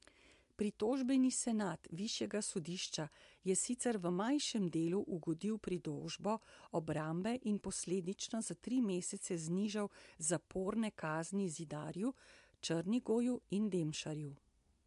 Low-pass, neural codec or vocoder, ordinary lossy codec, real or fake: 10.8 kHz; none; MP3, 64 kbps; real